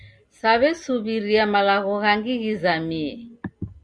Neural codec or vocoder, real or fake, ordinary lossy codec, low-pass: none; real; Opus, 64 kbps; 9.9 kHz